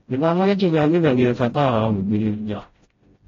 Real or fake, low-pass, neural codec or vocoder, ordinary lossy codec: fake; 7.2 kHz; codec, 16 kHz, 0.5 kbps, FreqCodec, smaller model; AAC, 32 kbps